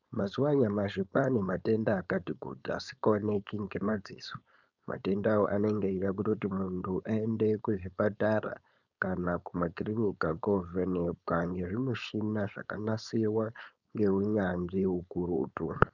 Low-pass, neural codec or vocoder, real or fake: 7.2 kHz; codec, 16 kHz, 4.8 kbps, FACodec; fake